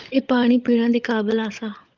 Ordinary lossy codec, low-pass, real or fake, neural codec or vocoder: Opus, 24 kbps; 7.2 kHz; fake; codec, 16 kHz, 16 kbps, FunCodec, trained on LibriTTS, 50 frames a second